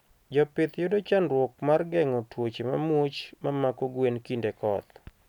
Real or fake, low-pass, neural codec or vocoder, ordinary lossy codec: real; 19.8 kHz; none; none